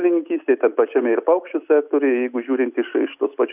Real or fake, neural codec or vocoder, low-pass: real; none; 3.6 kHz